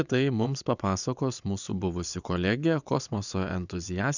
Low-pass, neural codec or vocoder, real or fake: 7.2 kHz; vocoder, 22.05 kHz, 80 mel bands, WaveNeXt; fake